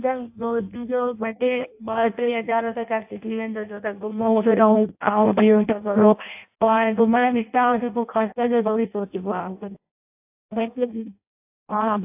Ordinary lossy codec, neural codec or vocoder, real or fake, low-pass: AAC, 32 kbps; codec, 16 kHz in and 24 kHz out, 0.6 kbps, FireRedTTS-2 codec; fake; 3.6 kHz